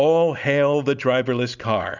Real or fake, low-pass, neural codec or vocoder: real; 7.2 kHz; none